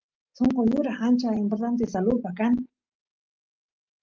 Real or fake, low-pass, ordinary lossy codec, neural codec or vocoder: real; 7.2 kHz; Opus, 32 kbps; none